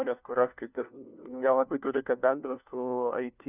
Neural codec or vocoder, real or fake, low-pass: codec, 16 kHz, 1 kbps, FunCodec, trained on LibriTTS, 50 frames a second; fake; 3.6 kHz